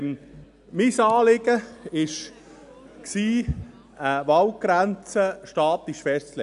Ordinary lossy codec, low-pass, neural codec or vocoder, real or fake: none; 10.8 kHz; none; real